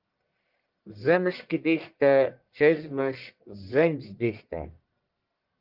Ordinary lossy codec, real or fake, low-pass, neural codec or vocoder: Opus, 32 kbps; fake; 5.4 kHz; codec, 44.1 kHz, 1.7 kbps, Pupu-Codec